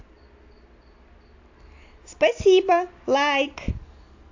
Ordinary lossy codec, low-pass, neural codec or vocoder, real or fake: AAC, 48 kbps; 7.2 kHz; none; real